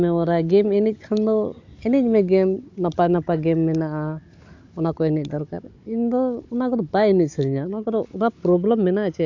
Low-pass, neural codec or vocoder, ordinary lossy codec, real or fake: 7.2 kHz; codec, 16 kHz, 16 kbps, FunCodec, trained on Chinese and English, 50 frames a second; AAC, 48 kbps; fake